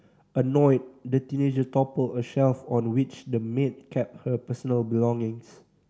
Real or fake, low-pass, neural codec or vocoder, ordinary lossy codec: real; none; none; none